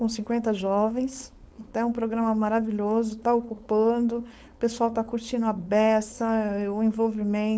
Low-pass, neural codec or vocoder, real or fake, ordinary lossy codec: none; codec, 16 kHz, 4.8 kbps, FACodec; fake; none